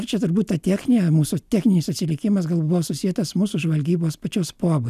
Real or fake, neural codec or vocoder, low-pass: real; none; 14.4 kHz